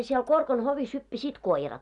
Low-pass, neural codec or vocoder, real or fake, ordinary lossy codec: 10.8 kHz; none; real; none